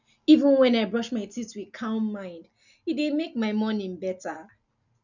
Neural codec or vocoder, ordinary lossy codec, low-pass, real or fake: none; none; 7.2 kHz; real